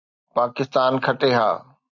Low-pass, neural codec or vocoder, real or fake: 7.2 kHz; none; real